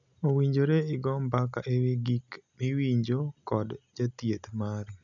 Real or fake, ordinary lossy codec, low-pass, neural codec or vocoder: real; none; 7.2 kHz; none